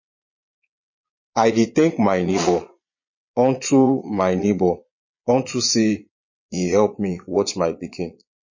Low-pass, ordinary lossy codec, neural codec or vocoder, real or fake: 7.2 kHz; MP3, 32 kbps; vocoder, 22.05 kHz, 80 mel bands, Vocos; fake